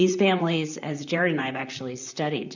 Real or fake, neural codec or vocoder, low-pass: fake; vocoder, 44.1 kHz, 128 mel bands, Pupu-Vocoder; 7.2 kHz